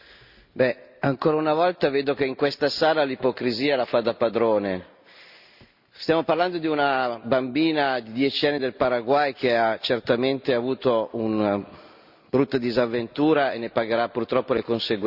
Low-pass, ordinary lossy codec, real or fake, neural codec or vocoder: 5.4 kHz; Opus, 64 kbps; real; none